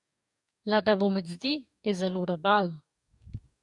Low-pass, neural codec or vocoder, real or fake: 10.8 kHz; codec, 44.1 kHz, 2.6 kbps, DAC; fake